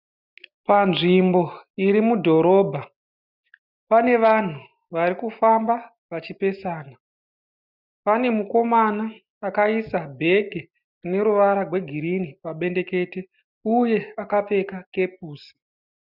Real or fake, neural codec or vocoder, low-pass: real; none; 5.4 kHz